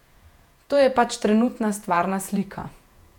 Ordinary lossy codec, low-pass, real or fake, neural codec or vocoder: none; 19.8 kHz; fake; vocoder, 48 kHz, 128 mel bands, Vocos